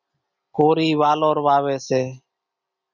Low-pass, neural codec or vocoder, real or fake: 7.2 kHz; none; real